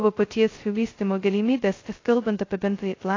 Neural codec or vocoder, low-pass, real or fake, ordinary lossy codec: codec, 16 kHz, 0.2 kbps, FocalCodec; 7.2 kHz; fake; AAC, 32 kbps